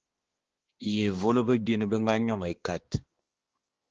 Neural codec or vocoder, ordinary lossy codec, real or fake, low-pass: codec, 16 kHz, 2 kbps, X-Codec, HuBERT features, trained on balanced general audio; Opus, 16 kbps; fake; 7.2 kHz